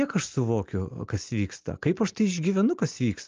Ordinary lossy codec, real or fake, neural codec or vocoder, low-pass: Opus, 24 kbps; real; none; 7.2 kHz